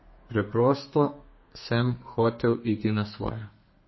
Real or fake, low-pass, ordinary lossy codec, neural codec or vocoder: fake; 7.2 kHz; MP3, 24 kbps; codec, 32 kHz, 1.9 kbps, SNAC